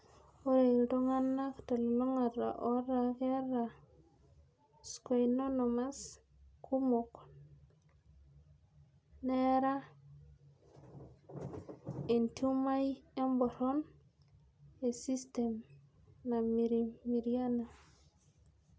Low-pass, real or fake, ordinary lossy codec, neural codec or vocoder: none; real; none; none